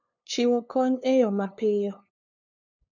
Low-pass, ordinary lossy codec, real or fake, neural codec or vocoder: 7.2 kHz; none; fake; codec, 16 kHz, 2 kbps, FunCodec, trained on LibriTTS, 25 frames a second